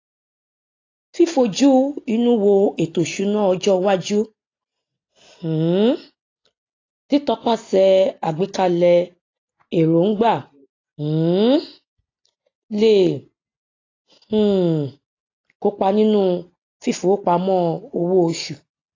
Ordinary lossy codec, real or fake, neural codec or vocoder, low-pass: AAC, 32 kbps; real; none; 7.2 kHz